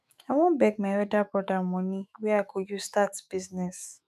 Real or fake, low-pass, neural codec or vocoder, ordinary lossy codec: fake; 14.4 kHz; autoencoder, 48 kHz, 128 numbers a frame, DAC-VAE, trained on Japanese speech; none